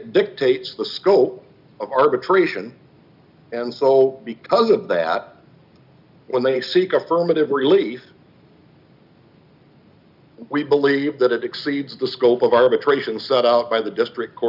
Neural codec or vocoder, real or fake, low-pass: none; real; 5.4 kHz